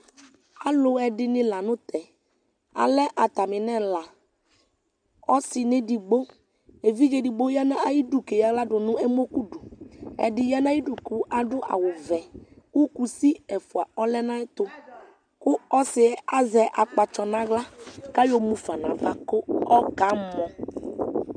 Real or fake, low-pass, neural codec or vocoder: real; 9.9 kHz; none